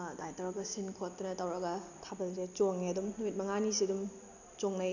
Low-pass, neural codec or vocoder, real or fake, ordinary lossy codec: 7.2 kHz; none; real; none